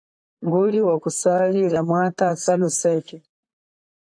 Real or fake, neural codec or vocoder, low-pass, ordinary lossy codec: fake; vocoder, 44.1 kHz, 128 mel bands, Pupu-Vocoder; 9.9 kHz; AAC, 64 kbps